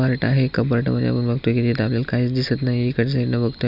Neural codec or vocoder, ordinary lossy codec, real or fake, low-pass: none; none; real; 5.4 kHz